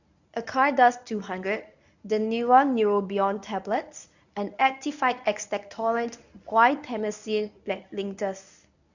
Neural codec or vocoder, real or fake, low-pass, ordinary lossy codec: codec, 24 kHz, 0.9 kbps, WavTokenizer, medium speech release version 1; fake; 7.2 kHz; none